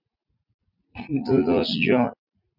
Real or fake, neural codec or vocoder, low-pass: fake; vocoder, 44.1 kHz, 80 mel bands, Vocos; 5.4 kHz